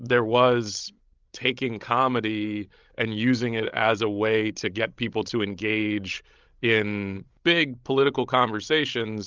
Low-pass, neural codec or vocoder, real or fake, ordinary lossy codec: 7.2 kHz; codec, 16 kHz, 16 kbps, FreqCodec, larger model; fake; Opus, 24 kbps